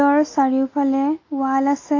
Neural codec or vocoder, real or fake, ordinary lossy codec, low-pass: none; real; AAC, 32 kbps; 7.2 kHz